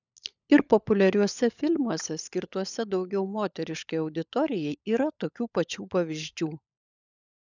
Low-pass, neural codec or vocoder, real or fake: 7.2 kHz; codec, 16 kHz, 16 kbps, FunCodec, trained on LibriTTS, 50 frames a second; fake